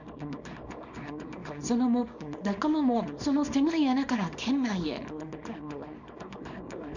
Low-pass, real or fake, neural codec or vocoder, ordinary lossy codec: 7.2 kHz; fake; codec, 24 kHz, 0.9 kbps, WavTokenizer, small release; none